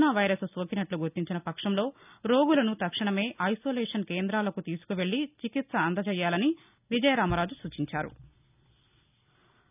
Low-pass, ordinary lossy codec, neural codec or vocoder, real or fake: 3.6 kHz; none; none; real